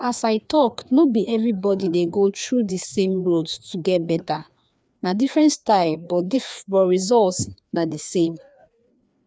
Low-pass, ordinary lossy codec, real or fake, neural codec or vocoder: none; none; fake; codec, 16 kHz, 2 kbps, FreqCodec, larger model